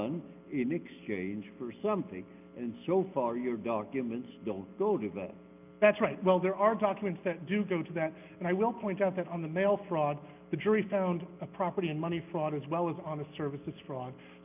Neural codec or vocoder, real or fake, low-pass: none; real; 3.6 kHz